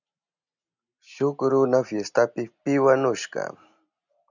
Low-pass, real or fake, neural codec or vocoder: 7.2 kHz; real; none